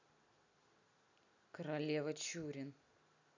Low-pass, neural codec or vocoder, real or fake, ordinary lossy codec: 7.2 kHz; none; real; none